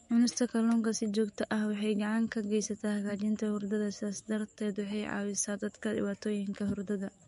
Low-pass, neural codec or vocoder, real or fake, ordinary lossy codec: 19.8 kHz; autoencoder, 48 kHz, 128 numbers a frame, DAC-VAE, trained on Japanese speech; fake; MP3, 48 kbps